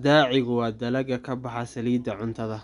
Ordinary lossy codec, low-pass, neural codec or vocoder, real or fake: none; 10.8 kHz; none; real